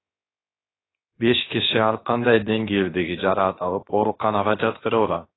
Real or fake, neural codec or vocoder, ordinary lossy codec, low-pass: fake; codec, 16 kHz, 0.7 kbps, FocalCodec; AAC, 16 kbps; 7.2 kHz